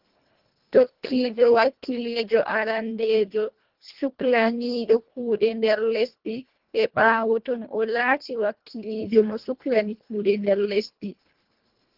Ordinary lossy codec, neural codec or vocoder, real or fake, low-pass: Opus, 16 kbps; codec, 24 kHz, 1.5 kbps, HILCodec; fake; 5.4 kHz